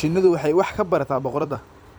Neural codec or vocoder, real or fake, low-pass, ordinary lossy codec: vocoder, 44.1 kHz, 128 mel bands every 256 samples, BigVGAN v2; fake; none; none